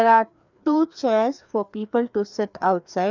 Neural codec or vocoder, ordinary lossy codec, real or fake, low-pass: codec, 16 kHz, 2 kbps, FreqCodec, larger model; none; fake; 7.2 kHz